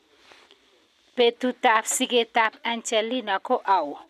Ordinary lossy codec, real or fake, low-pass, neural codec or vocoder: none; real; 14.4 kHz; none